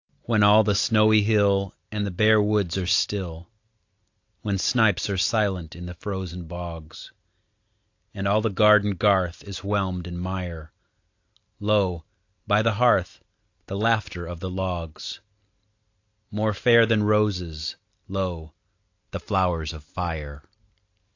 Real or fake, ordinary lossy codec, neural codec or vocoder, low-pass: real; AAC, 48 kbps; none; 7.2 kHz